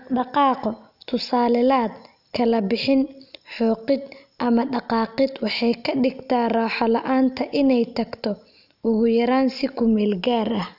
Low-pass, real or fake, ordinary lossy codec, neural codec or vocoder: 5.4 kHz; real; none; none